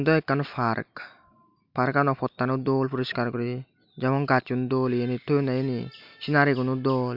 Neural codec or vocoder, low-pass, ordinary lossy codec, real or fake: none; 5.4 kHz; MP3, 48 kbps; real